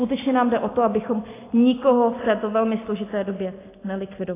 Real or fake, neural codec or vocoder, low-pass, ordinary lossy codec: real; none; 3.6 kHz; AAC, 16 kbps